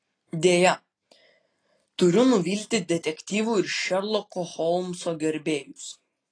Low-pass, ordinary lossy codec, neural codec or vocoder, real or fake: 9.9 kHz; AAC, 32 kbps; none; real